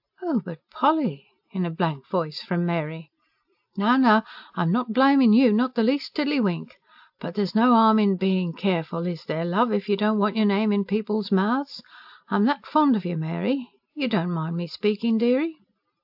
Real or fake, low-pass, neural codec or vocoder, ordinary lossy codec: real; 5.4 kHz; none; AAC, 48 kbps